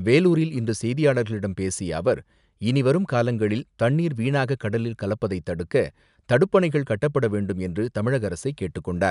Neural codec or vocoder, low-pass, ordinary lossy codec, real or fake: none; 10.8 kHz; none; real